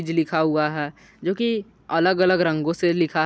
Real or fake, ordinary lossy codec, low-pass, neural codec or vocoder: real; none; none; none